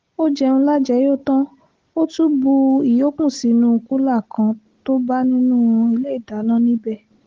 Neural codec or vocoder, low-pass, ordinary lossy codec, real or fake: none; 7.2 kHz; Opus, 16 kbps; real